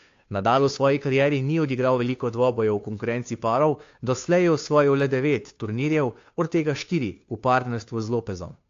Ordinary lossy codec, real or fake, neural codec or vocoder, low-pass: AAC, 48 kbps; fake; codec, 16 kHz, 2 kbps, FunCodec, trained on Chinese and English, 25 frames a second; 7.2 kHz